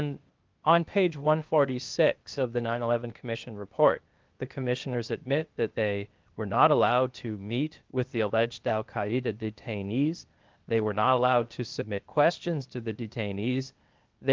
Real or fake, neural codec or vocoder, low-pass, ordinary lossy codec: fake; codec, 16 kHz, 0.8 kbps, ZipCodec; 7.2 kHz; Opus, 32 kbps